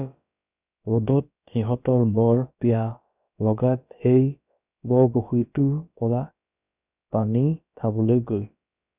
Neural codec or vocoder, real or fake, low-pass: codec, 16 kHz, about 1 kbps, DyCAST, with the encoder's durations; fake; 3.6 kHz